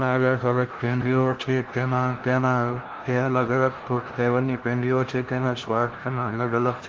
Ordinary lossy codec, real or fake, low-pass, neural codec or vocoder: Opus, 16 kbps; fake; 7.2 kHz; codec, 16 kHz, 0.5 kbps, FunCodec, trained on LibriTTS, 25 frames a second